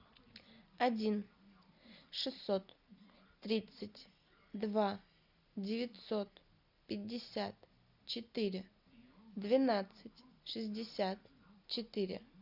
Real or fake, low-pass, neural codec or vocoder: real; 5.4 kHz; none